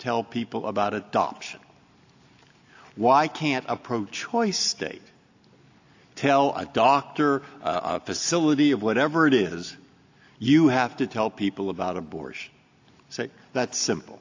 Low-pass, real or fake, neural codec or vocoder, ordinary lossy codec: 7.2 kHz; real; none; AAC, 48 kbps